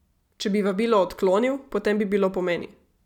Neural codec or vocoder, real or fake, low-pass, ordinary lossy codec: none; real; 19.8 kHz; none